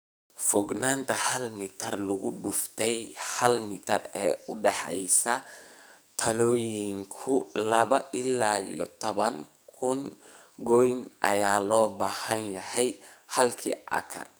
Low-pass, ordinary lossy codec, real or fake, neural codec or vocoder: none; none; fake; codec, 44.1 kHz, 2.6 kbps, SNAC